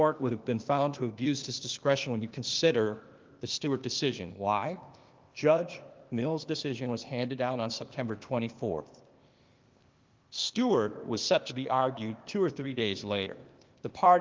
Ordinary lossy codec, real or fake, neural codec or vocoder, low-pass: Opus, 32 kbps; fake; codec, 16 kHz, 0.8 kbps, ZipCodec; 7.2 kHz